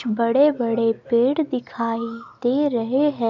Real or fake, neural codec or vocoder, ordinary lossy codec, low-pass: real; none; none; 7.2 kHz